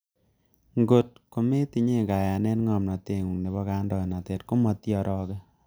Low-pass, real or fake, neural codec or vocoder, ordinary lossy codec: none; real; none; none